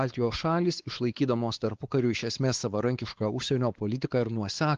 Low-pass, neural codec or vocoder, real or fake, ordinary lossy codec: 7.2 kHz; codec, 16 kHz, 4 kbps, X-Codec, WavLM features, trained on Multilingual LibriSpeech; fake; Opus, 16 kbps